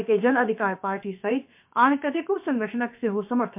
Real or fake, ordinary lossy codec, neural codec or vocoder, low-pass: fake; MP3, 32 kbps; codec, 16 kHz, about 1 kbps, DyCAST, with the encoder's durations; 3.6 kHz